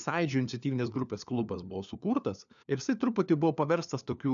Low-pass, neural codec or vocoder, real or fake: 7.2 kHz; codec, 16 kHz, 4 kbps, FunCodec, trained on LibriTTS, 50 frames a second; fake